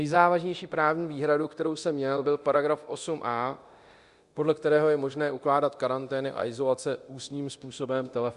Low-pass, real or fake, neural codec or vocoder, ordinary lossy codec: 10.8 kHz; fake; codec, 24 kHz, 0.9 kbps, DualCodec; Opus, 64 kbps